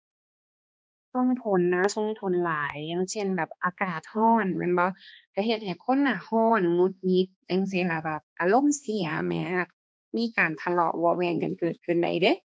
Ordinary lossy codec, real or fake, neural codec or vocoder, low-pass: none; fake; codec, 16 kHz, 2 kbps, X-Codec, HuBERT features, trained on balanced general audio; none